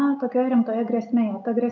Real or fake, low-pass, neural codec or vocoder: real; 7.2 kHz; none